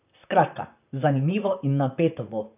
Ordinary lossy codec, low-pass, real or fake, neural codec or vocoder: none; 3.6 kHz; fake; vocoder, 44.1 kHz, 128 mel bands, Pupu-Vocoder